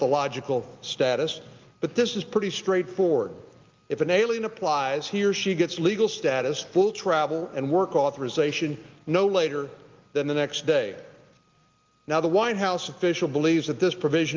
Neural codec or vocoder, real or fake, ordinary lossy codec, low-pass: none; real; Opus, 24 kbps; 7.2 kHz